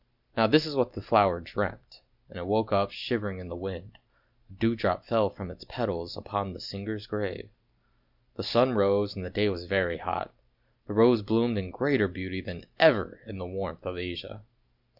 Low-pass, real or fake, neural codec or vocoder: 5.4 kHz; real; none